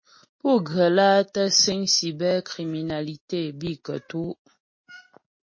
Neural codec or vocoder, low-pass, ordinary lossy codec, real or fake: none; 7.2 kHz; MP3, 32 kbps; real